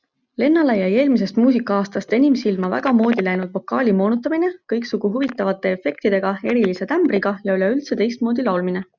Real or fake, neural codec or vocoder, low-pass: real; none; 7.2 kHz